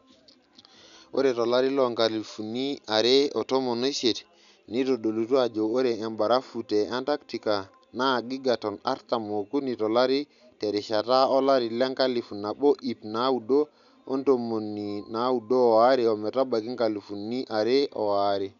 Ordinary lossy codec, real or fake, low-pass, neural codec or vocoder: none; real; 7.2 kHz; none